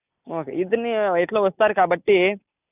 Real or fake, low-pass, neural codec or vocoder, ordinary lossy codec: real; 3.6 kHz; none; none